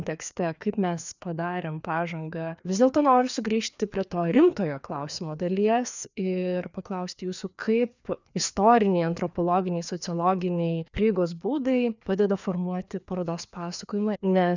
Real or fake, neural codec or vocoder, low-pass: fake; codec, 16 kHz, 2 kbps, FreqCodec, larger model; 7.2 kHz